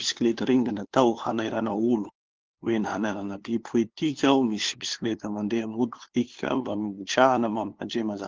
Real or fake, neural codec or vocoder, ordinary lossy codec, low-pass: fake; codec, 16 kHz, 2 kbps, FunCodec, trained on LibriTTS, 25 frames a second; Opus, 16 kbps; 7.2 kHz